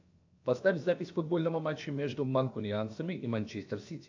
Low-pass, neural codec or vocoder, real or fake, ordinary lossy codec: 7.2 kHz; codec, 16 kHz, about 1 kbps, DyCAST, with the encoder's durations; fake; AAC, 48 kbps